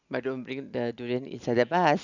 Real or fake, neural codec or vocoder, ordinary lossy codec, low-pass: real; none; none; 7.2 kHz